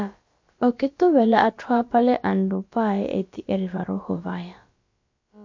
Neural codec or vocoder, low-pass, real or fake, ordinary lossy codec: codec, 16 kHz, about 1 kbps, DyCAST, with the encoder's durations; 7.2 kHz; fake; MP3, 48 kbps